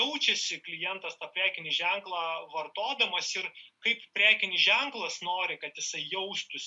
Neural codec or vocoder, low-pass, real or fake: none; 10.8 kHz; real